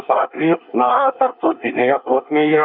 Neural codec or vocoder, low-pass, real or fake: codec, 24 kHz, 1 kbps, SNAC; 5.4 kHz; fake